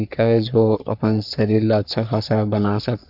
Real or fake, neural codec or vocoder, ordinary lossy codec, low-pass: fake; codec, 44.1 kHz, 3.4 kbps, Pupu-Codec; none; 5.4 kHz